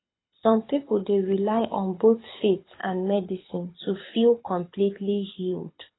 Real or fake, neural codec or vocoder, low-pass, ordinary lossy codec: fake; codec, 24 kHz, 6 kbps, HILCodec; 7.2 kHz; AAC, 16 kbps